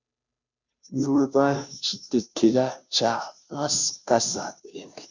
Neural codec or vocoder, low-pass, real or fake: codec, 16 kHz, 0.5 kbps, FunCodec, trained on Chinese and English, 25 frames a second; 7.2 kHz; fake